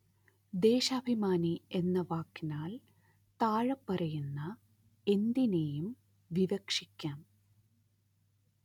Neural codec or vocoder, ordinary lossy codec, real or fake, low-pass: none; none; real; 19.8 kHz